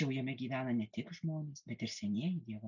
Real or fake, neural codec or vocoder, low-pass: real; none; 7.2 kHz